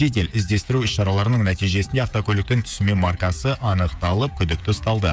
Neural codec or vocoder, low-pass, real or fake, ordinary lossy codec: codec, 16 kHz, 16 kbps, FreqCodec, smaller model; none; fake; none